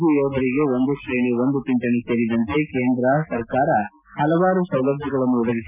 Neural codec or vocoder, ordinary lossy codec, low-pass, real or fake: none; none; 3.6 kHz; real